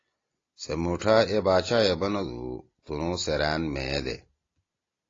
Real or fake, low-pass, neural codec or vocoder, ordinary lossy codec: real; 7.2 kHz; none; AAC, 32 kbps